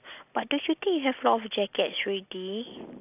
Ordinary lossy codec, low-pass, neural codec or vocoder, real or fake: AAC, 32 kbps; 3.6 kHz; none; real